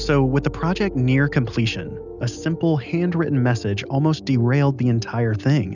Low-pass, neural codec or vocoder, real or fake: 7.2 kHz; none; real